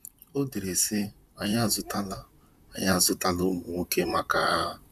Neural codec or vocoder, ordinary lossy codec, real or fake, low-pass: vocoder, 44.1 kHz, 128 mel bands, Pupu-Vocoder; none; fake; 14.4 kHz